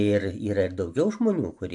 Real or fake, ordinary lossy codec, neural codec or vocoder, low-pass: real; AAC, 64 kbps; none; 10.8 kHz